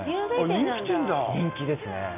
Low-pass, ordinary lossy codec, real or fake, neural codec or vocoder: 3.6 kHz; none; real; none